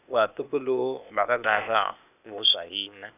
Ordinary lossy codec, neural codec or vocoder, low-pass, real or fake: none; codec, 16 kHz, 0.8 kbps, ZipCodec; 3.6 kHz; fake